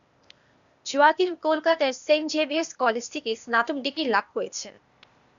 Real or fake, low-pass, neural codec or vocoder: fake; 7.2 kHz; codec, 16 kHz, 0.8 kbps, ZipCodec